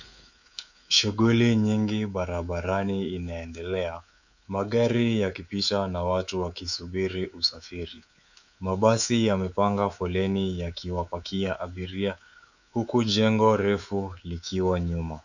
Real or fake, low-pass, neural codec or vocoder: fake; 7.2 kHz; codec, 24 kHz, 3.1 kbps, DualCodec